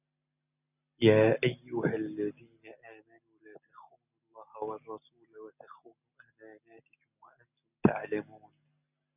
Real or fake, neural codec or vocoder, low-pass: real; none; 3.6 kHz